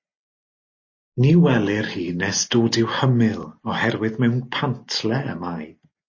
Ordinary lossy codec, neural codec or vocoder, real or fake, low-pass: MP3, 32 kbps; none; real; 7.2 kHz